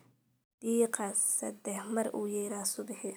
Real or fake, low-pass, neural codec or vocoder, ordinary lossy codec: real; none; none; none